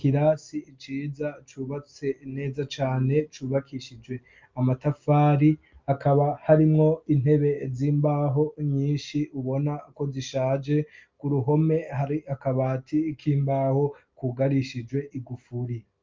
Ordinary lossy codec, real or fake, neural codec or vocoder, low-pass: Opus, 24 kbps; real; none; 7.2 kHz